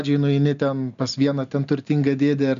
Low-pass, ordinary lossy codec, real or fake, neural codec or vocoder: 7.2 kHz; AAC, 96 kbps; real; none